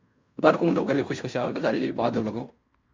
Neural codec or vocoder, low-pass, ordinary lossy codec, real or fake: codec, 16 kHz in and 24 kHz out, 0.9 kbps, LongCat-Audio-Codec, fine tuned four codebook decoder; 7.2 kHz; MP3, 64 kbps; fake